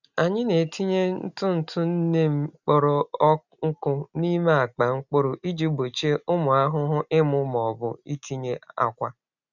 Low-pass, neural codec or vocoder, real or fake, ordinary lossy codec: 7.2 kHz; none; real; none